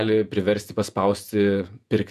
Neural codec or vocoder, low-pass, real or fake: vocoder, 48 kHz, 128 mel bands, Vocos; 14.4 kHz; fake